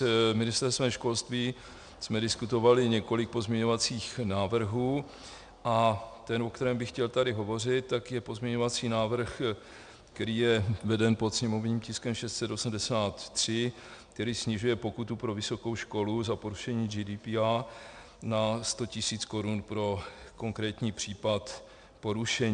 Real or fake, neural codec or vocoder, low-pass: real; none; 9.9 kHz